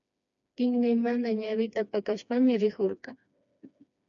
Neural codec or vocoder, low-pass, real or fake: codec, 16 kHz, 2 kbps, FreqCodec, smaller model; 7.2 kHz; fake